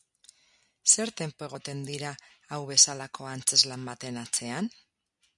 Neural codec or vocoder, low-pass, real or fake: none; 10.8 kHz; real